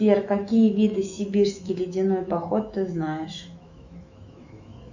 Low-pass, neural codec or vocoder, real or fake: 7.2 kHz; autoencoder, 48 kHz, 128 numbers a frame, DAC-VAE, trained on Japanese speech; fake